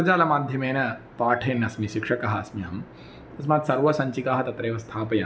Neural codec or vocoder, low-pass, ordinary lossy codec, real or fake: none; none; none; real